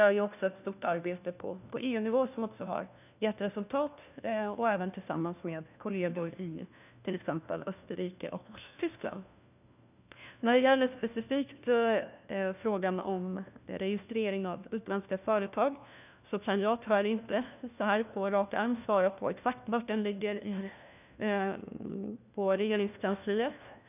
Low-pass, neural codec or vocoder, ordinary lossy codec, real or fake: 3.6 kHz; codec, 16 kHz, 1 kbps, FunCodec, trained on LibriTTS, 50 frames a second; none; fake